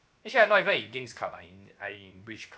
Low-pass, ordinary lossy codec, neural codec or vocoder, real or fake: none; none; codec, 16 kHz, 0.7 kbps, FocalCodec; fake